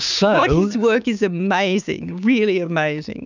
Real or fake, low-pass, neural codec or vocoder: fake; 7.2 kHz; codec, 16 kHz, 6 kbps, DAC